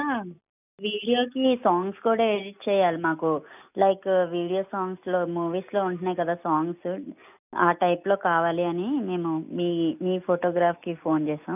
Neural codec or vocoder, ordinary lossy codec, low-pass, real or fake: none; none; 3.6 kHz; real